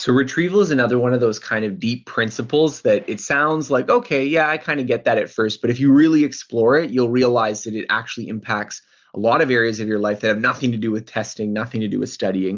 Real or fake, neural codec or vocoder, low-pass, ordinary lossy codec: real; none; 7.2 kHz; Opus, 24 kbps